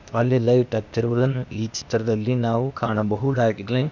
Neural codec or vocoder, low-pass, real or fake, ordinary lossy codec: codec, 16 kHz, 0.8 kbps, ZipCodec; 7.2 kHz; fake; none